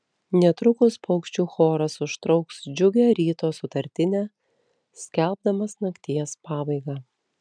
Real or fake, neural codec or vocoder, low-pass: real; none; 9.9 kHz